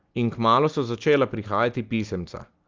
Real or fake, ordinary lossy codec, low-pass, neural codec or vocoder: real; Opus, 24 kbps; 7.2 kHz; none